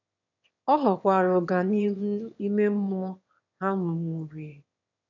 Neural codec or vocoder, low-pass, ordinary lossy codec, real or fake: autoencoder, 22.05 kHz, a latent of 192 numbers a frame, VITS, trained on one speaker; 7.2 kHz; none; fake